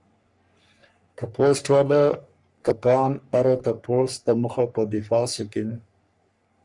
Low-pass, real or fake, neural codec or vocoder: 10.8 kHz; fake; codec, 44.1 kHz, 3.4 kbps, Pupu-Codec